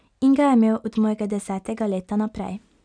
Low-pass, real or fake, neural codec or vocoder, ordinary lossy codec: 9.9 kHz; real; none; none